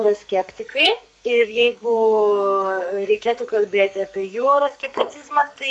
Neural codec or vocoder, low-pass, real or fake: codec, 44.1 kHz, 2.6 kbps, SNAC; 10.8 kHz; fake